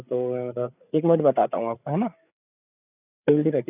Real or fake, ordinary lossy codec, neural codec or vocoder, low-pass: fake; none; codec, 16 kHz, 8 kbps, FreqCodec, larger model; 3.6 kHz